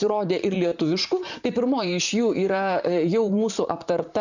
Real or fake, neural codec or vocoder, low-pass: fake; vocoder, 44.1 kHz, 128 mel bands, Pupu-Vocoder; 7.2 kHz